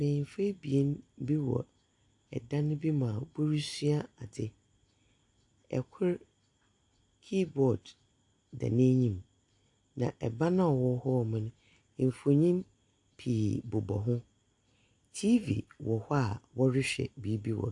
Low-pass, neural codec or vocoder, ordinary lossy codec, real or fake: 10.8 kHz; none; AAC, 64 kbps; real